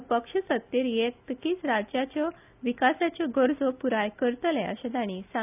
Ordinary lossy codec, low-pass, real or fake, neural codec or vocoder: none; 3.6 kHz; real; none